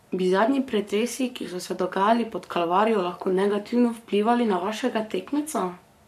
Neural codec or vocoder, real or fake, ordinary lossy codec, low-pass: codec, 44.1 kHz, 7.8 kbps, Pupu-Codec; fake; none; 14.4 kHz